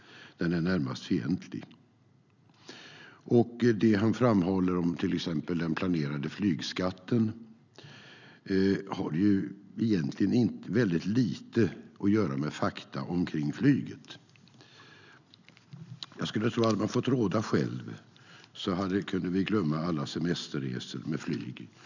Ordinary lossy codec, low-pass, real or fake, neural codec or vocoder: none; 7.2 kHz; real; none